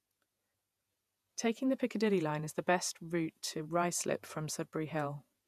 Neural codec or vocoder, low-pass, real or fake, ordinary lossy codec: vocoder, 48 kHz, 128 mel bands, Vocos; 14.4 kHz; fake; none